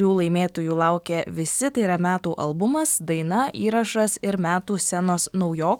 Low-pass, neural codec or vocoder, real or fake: 19.8 kHz; codec, 44.1 kHz, 7.8 kbps, DAC; fake